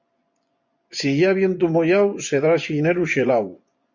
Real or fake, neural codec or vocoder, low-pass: fake; vocoder, 24 kHz, 100 mel bands, Vocos; 7.2 kHz